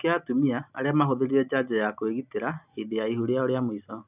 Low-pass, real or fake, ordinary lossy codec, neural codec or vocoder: 3.6 kHz; real; none; none